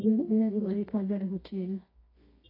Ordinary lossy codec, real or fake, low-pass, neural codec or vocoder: AAC, 24 kbps; fake; 5.4 kHz; codec, 24 kHz, 0.9 kbps, WavTokenizer, medium music audio release